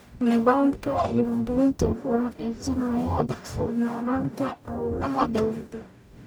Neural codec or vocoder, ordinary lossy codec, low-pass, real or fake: codec, 44.1 kHz, 0.9 kbps, DAC; none; none; fake